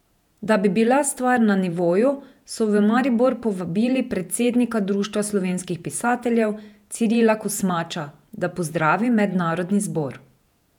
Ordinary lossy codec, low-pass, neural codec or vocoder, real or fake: none; 19.8 kHz; vocoder, 48 kHz, 128 mel bands, Vocos; fake